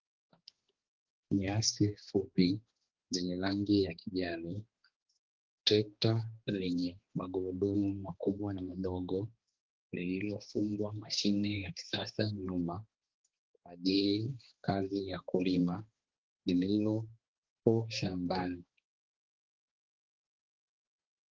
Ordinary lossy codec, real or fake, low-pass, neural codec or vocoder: Opus, 16 kbps; fake; 7.2 kHz; codec, 16 kHz, 2 kbps, X-Codec, HuBERT features, trained on balanced general audio